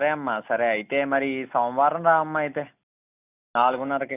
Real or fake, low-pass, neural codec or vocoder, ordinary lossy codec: real; 3.6 kHz; none; none